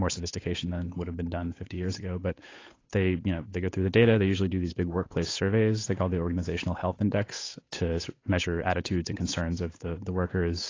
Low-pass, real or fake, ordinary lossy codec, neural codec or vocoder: 7.2 kHz; fake; AAC, 32 kbps; codec, 16 kHz, 8 kbps, FunCodec, trained on Chinese and English, 25 frames a second